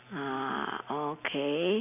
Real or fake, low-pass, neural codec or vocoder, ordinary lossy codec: real; 3.6 kHz; none; none